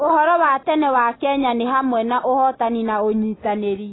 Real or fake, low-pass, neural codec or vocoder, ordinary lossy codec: real; 7.2 kHz; none; AAC, 16 kbps